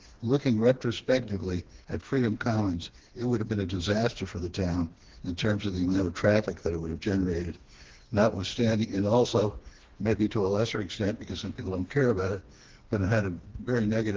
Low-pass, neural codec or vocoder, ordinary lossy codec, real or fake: 7.2 kHz; codec, 16 kHz, 2 kbps, FreqCodec, smaller model; Opus, 16 kbps; fake